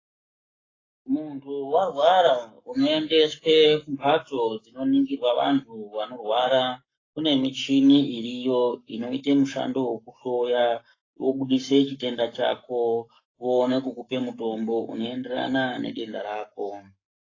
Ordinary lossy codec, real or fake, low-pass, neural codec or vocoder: AAC, 32 kbps; fake; 7.2 kHz; codec, 44.1 kHz, 7.8 kbps, Pupu-Codec